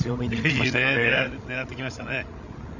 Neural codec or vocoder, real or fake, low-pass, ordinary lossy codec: codec, 16 kHz, 16 kbps, FreqCodec, larger model; fake; 7.2 kHz; none